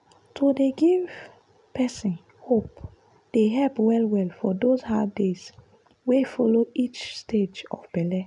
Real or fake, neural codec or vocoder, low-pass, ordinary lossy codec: real; none; 10.8 kHz; none